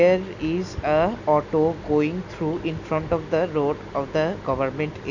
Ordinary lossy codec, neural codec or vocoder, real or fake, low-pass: none; none; real; 7.2 kHz